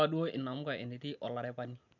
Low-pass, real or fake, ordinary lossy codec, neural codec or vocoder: 7.2 kHz; real; none; none